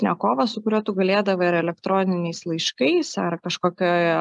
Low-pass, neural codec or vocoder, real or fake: 10.8 kHz; none; real